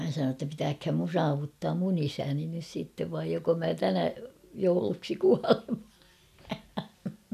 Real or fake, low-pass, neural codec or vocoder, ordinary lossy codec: real; 14.4 kHz; none; none